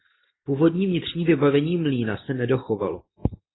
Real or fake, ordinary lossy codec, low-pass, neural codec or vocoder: real; AAC, 16 kbps; 7.2 kHz; none